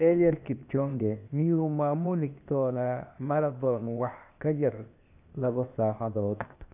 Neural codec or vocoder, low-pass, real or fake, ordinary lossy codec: codec, 16 kHz, 0.8 kbps, ZipCodec; 3.6 kHz; fake; none